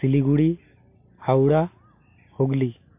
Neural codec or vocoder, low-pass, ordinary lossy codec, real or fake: none; 3.6 kHz; MP3, 24 kbps; real